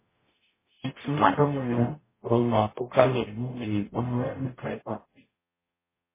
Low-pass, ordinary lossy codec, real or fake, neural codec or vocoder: 3.6 kHz; MP3, 16 kbps; fake; codec, 44.1 kHz, 0.9 kbps, DAC